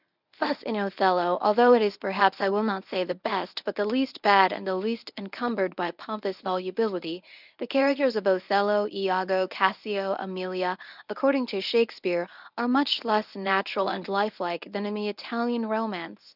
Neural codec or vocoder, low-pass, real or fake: codec, 24 kHz, 0.9 kbps, WavTokenizer, medium speech release version 1; 5.4 kHz; fake